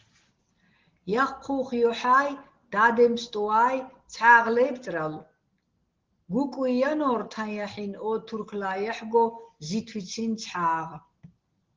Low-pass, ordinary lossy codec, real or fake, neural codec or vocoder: 7.2 kHz; Opus, 16 kbps; real; none